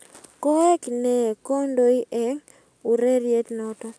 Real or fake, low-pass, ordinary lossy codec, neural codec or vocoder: real; none; none; none